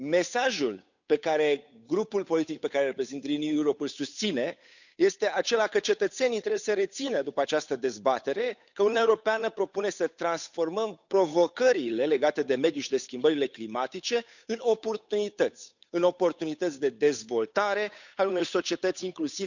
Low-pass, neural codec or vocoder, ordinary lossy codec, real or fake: 7.2 kHz; codec, 16 kHz, 8 kbps, FunCodec, trained on Chinese and English, 25 frames a second; none; fake